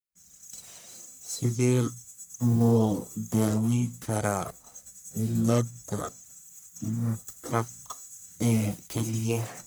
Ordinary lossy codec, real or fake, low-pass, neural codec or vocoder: none; fake; none; codec, 44.1 kHz, 1.7 kbps, Pupu-Codec